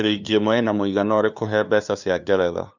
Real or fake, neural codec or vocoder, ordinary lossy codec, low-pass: fake; codec, 16 kHz, 2 kbps, FunCodec, trained on LibriTTS, 25 frames a second; none; 7.2 kHz